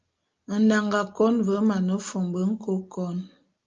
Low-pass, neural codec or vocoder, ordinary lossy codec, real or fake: 7.2 kHz; none; Opus, 24 kbps; real